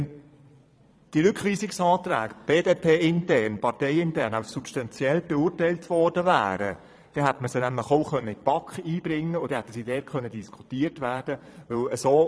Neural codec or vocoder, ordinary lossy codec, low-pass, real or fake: vocoder, 22.05 kHz, 80 mel bands, Vocos; none; none; fake